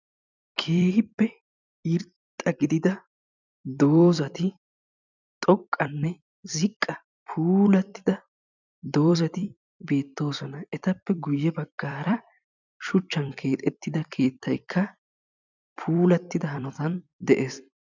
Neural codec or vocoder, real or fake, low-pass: vocoder, 44.1 kHz, 128 mel bands every 256 samples, BigVGAN v2; fake; 7.2 kHz